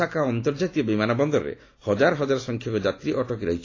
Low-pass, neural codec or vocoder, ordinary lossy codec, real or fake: 7.2 kHz; none; AAC, 32 kbps; real